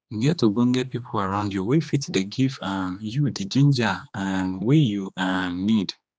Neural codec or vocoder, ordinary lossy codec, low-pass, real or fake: codec, 16 kHz, 2 kbps, X-Codec, HuBERT features, trained on general audio; none; none; fake